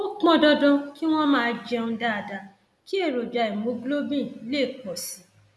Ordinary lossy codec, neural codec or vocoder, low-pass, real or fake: none; none; none; real